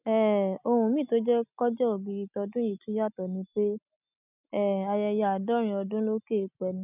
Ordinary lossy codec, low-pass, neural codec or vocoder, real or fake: none; 3.6 kHz; none; real